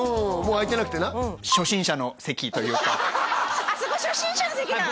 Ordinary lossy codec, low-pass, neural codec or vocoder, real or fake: none; none; none; real